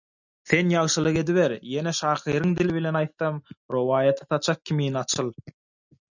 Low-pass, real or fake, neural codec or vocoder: 7.2 kHz; real; none